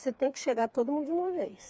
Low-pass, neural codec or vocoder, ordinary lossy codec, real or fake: none; codec, 16 kHz, 4 kbps, FreqCodec, smaller model; none; fake